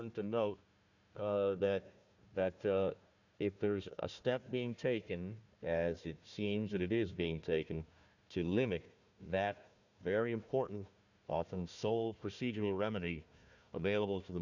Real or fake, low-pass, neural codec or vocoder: fake; 7.2 kHz; codec, 16 kHz, 1 kbps, FunCodec, trained on Chinese and English, 50 frames a second